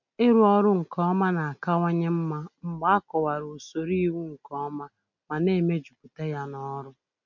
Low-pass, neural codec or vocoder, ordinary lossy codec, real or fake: 7.2 kHz; none; none; real